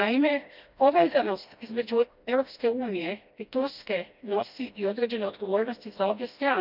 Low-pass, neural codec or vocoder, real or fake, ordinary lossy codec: 5.4 kHz; codec, 16 kHz, 1 kbps, FreqCodec, smaller model; fake; none